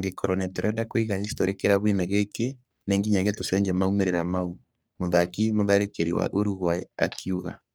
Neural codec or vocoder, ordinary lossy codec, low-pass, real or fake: codec, 44.1 kHz, 3.4 kbps, Pupu-Codec; none; none; fake